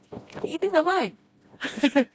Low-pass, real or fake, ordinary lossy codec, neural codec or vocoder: none; fake; none; codec, 16 kHz, 1 kbps, FreqCodec, smaller model